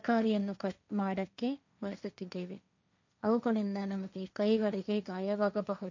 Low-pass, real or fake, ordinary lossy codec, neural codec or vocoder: 7.2 kHz; fake; none; codec, 16 kHz, 1.1 kbps, Voila-Tokenizer